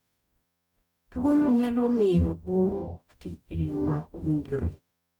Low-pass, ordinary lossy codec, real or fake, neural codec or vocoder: 19.8 kHz; none; fake; codec, 44.1 kHz, 0.9 kbps, DAC